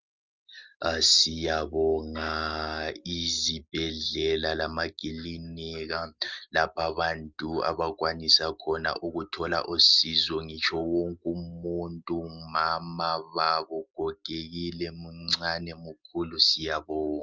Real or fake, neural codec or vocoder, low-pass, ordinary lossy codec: real; none; 7.2 kHz; Opus, 32 kbps